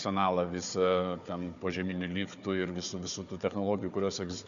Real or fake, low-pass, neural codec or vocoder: fake; 7.2 kHz; codec, 16 kHz, 4 kbps, FunCodec, trained on Chinese and English, 50 frames a second